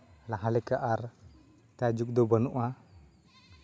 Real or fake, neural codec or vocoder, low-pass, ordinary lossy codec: real; none; none; none